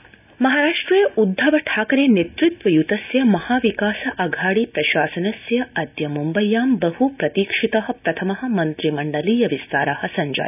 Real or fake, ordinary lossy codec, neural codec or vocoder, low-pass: real; none; none; 3.6 kHz